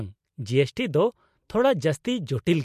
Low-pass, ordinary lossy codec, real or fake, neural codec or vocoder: 14.4 kHz; MP3, 64 kbps; real; none